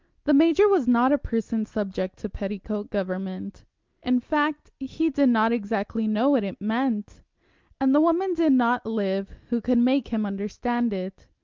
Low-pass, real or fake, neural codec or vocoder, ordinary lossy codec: 7.2 kHz; real; none; Opus, 32 kbps